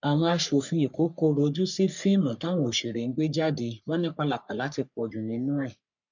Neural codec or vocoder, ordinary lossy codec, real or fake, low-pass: codec, 44.1 kHz, 3.4 kbps, Pupu-Codec; none; fake; 7.2 kHz